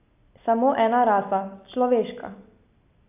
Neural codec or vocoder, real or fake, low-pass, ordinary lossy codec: none; real; 3.6 kHz; AAC, 32 kbps